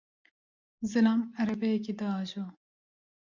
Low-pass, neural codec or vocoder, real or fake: 7.2 kHz; none; real